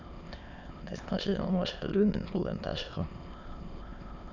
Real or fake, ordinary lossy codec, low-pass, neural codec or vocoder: fake; none; 7.2 kHz; autoencoder, 22.05 kHz, a latent of 192 numbers a frame, VITS, trained on many speakers